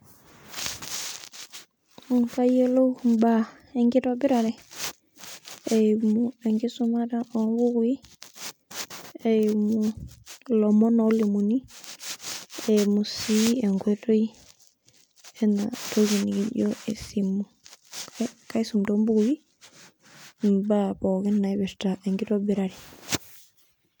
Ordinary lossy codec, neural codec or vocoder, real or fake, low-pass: none; none; real; none